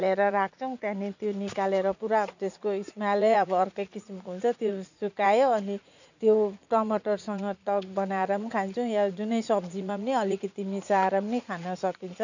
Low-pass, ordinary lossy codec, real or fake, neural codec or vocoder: 7.2 kHz; none; fake; vocoder, 44.1 kHz, 80 mel bands, Vocos